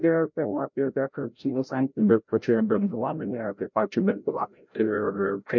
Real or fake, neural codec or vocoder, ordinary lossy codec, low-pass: fake; codec, 16 kHz, 0.5 kbps, FreqCodec, larger model; MP3, 48 kbps; 7.2 kHz